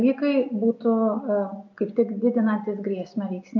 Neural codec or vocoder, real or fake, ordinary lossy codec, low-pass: none; real; AAC, 48 kbps; 7.2 kHz